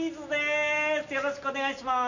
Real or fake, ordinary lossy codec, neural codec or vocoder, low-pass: real; none; none; 7.2 kHz